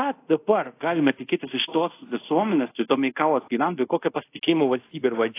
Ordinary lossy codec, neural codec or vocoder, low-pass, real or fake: AAC, 24 kbps; codec, 24 kHz, 0.5 kbps, DualCodec; 3.6 kHz; fake